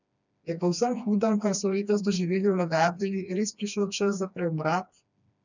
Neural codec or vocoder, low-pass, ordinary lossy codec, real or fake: codec, 16 kHz, 2 kbps, FreqCodec, smaller model; 7.2 kHz; none; fake